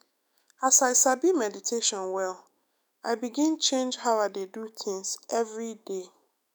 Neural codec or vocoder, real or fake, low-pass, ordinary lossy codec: autoencoder, 48 kHz, 128 numbers a frame, DAC-VAE, trained on Japanese speech; fake; none; none